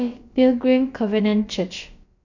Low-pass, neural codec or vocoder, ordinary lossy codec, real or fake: 7.2 kHz; codec, 16 kHz, about 1 kbps, DyCAST, with the encoder's durations; none; fake